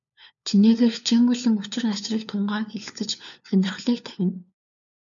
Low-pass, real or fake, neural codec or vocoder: 7.2 kHz; fake; codec, 16 kHz, 4 kbps, FunCodec, trained on LibriTTS, 50 frames a second